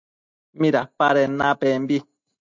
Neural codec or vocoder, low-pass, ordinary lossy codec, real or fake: none; 7.2 kHz; MP3, 64 kbps; real